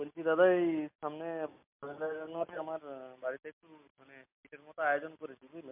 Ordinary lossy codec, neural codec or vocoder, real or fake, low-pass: MP3, 32 kbps; none; real; 3.6 kHz